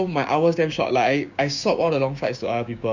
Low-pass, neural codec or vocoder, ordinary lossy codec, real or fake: 7.2 kHz; codec, 16 kHz, 6 kbps, DAC; none; fake